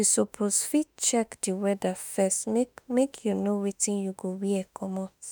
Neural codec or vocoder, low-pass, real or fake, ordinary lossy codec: autoencoder, 48 kHz, 32 numbers a frame, DAC-VAE, trained on Japanese speech; none; fake; none